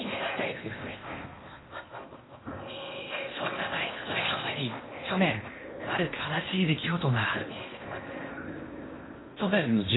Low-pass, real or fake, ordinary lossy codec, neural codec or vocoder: 7.2 kHz; fake; AAC, 16 kbps; codec, 16 kHz in and 24 kHz out, 0.8 kbps, FocalCodec, streaming, 65536 codes